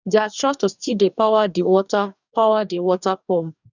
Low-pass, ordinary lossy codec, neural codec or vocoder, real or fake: 7.2 kHz; none; codec, 44.1 kHz, 2.6 kbps, DAC; fake